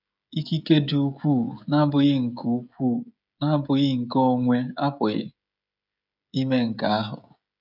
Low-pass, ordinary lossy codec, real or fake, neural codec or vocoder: 5.4 kHz; none; fake; codec, 16 kHz, 16 kbps, FreqCodec, smaller model